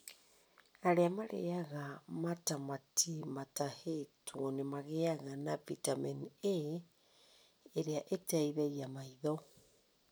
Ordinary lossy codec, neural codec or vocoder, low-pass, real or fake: none; none; none; real